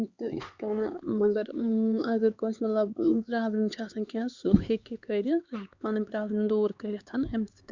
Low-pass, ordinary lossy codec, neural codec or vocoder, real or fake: 7.2 kHz; Opus, 64 kbps; codec, 16 kHz, 4 kbps, X-Codec, HuBERT features, trained on LibriSpeech; fake